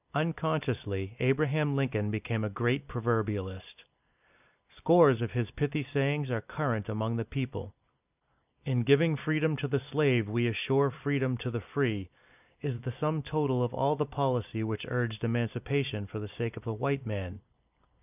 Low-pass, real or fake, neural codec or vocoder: 3.6 kHz; fake; vocoder, 44.1 kHz, 128 mel bands every 256 samples, BigVGAN v2